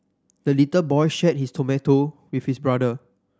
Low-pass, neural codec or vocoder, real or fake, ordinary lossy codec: none; none; real; none